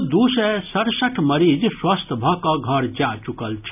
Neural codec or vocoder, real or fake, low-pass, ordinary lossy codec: none; real; 3.6 kHz; none